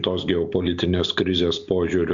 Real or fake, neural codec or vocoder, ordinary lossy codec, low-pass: real; none; MP3, 96 kbps; 7.2 kHz